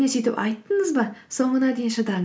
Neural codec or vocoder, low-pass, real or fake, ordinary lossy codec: none; none; real; none